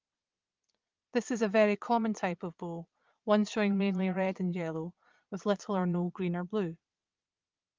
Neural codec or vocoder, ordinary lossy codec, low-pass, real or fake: vocoder, 22.05 kHz, 80 mel bands, Vocos; Opus, 24 kbps; 7.2 kHz; fake